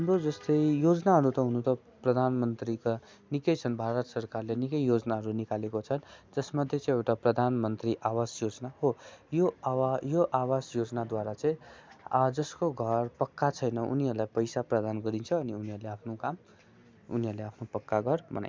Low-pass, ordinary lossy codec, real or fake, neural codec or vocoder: none; none; real; none